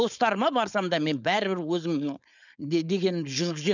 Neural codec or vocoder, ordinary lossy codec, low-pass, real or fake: codec, 16 kHz, 4.8 kbps, FACodec; none; 7.2 kHz; fake